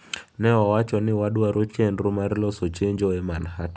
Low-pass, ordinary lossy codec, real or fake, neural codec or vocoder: none; none; real; none